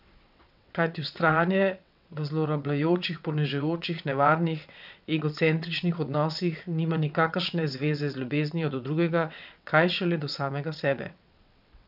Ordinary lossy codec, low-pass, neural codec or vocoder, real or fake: none; 5.4 kHz; vocoder, 22.05 kHz, 80 mel bands, WaveNeXt; fake